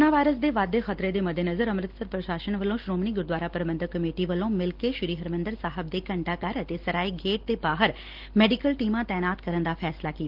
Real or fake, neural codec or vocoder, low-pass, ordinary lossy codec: real; none; 5.4 kHz; Opus, 16 kbps